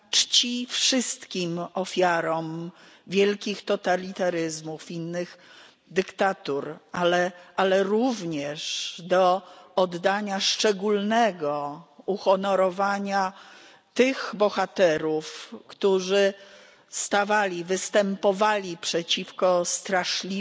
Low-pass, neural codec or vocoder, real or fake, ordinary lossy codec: none; none; real; none